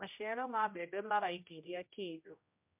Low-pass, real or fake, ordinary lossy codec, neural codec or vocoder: 3.6 kHz; fake; MP3, 32 kbps; codec, 16 kHz, 0.5 kbps, X-Codec, HuBERT features, trained on general audio